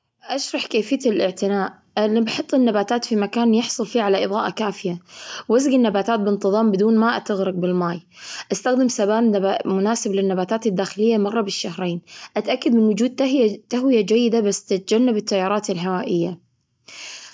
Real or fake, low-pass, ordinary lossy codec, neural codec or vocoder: real; none; none; none